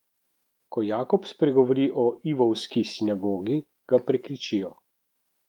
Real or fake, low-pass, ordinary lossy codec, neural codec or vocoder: fake; 19.8 kHz; Opus, 32 kbps; autoencoder, 48 kHz, 128 numbers a frame, DAC-VAE, trained on Japanese speech